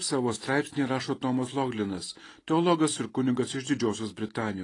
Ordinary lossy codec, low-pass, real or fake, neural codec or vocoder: AAC, 32 kbps; 10.8 kHz; real; none